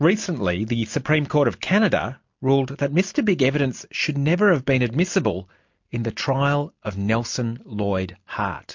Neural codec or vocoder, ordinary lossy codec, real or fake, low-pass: none; MP3, 48 kbps; real; 7.2 kHz